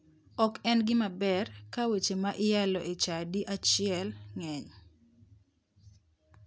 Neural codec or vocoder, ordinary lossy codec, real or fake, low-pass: none; none; real; none